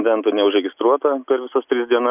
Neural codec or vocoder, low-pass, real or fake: none; 3.6 kHz; real